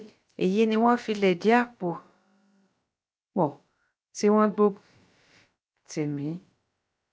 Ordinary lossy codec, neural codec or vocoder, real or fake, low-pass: none; codec, 16 kHz, about 1 kbps, DyCAST, with the encoder's durations; fake; none